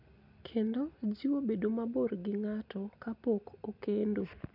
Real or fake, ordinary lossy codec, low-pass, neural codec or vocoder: real; none; 5.4 kHz; none